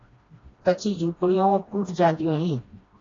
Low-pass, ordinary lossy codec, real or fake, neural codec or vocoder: 7.2 kHz; AAC, 32 kbps; fake; codec, 16 kHz, 1 kbps, FreqCodec, smaller model